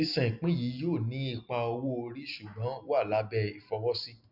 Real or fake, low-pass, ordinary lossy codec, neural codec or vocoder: real; 5.4 kHz; none; none